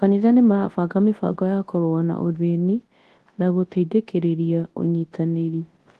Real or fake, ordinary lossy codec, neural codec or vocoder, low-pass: fake; Opus, 16 kbps; codec, 24 kHz, 0.9 kbps, WavTokenizer, large speech release; 10.8 kHz